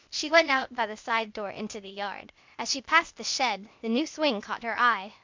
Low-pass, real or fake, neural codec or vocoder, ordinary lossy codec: 7.2 kHz; fake; codec, 16 kHz, 0.8 kbps, ZipCodec; MP3, 64 kbps